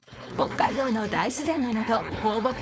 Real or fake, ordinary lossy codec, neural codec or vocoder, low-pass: fake; none; codec, 16 kHz, 4.8 kbps, FACodec; none